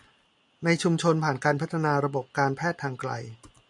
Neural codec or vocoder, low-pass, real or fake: none; 10.8 kHz; real